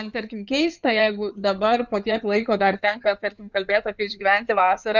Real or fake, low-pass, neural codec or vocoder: fake; 7.2 kHz; codec, 16 kHz in and 24 kHz out, 2.2 kbps, FireRedTTS-2 codec